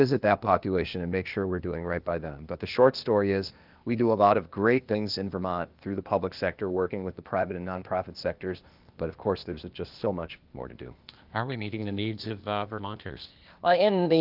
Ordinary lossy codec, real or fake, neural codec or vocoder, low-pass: Opus, 32 kbps; fake; codec, 16 kHz, 0.8 kbps, ZipCodec; 5.4 kHz